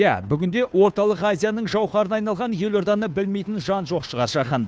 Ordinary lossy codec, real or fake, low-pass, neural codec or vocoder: none; fake; none; codec, 16 kHz, 2 kbps, FunCodec, trained on Chinese and English, 25 frames a second